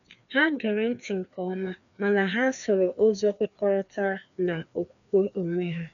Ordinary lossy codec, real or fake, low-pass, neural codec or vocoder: none; fake; 7.2 kHz; codec, 16 kHz, 2 kbps, FreqCodec, larger model